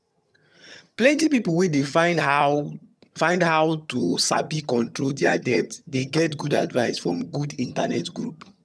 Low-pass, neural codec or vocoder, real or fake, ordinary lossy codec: none; vocoder, 22.05 kHz, 80 mel bands, HiFi-GAN; fake; none